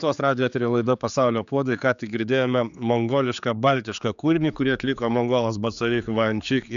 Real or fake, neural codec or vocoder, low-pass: fake; codec, 16 kHz, 4 kbps, X-Codec, HuBERT features, trained on general audio; 7.2 kHz